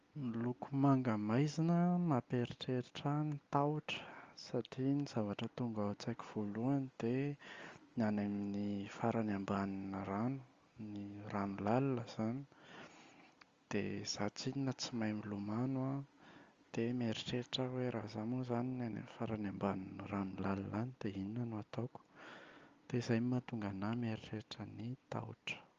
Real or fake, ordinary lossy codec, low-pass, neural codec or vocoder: real; Opus, 32 kbps; 7.2 kHz; none